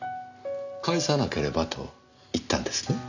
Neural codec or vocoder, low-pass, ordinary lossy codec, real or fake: none; 7.2 kHz; none; real